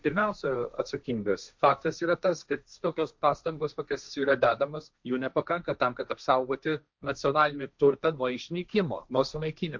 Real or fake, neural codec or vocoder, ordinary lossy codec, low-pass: fake; codec, 16 kHz, 1.1 kbps, Voila-Tokenizer; MP3, 64 kbps; 7.2 kHz